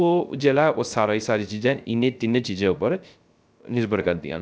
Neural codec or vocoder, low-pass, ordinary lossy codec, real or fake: codec, 16 kHz, 0.3 kbps, FocalCodec; none; none; fake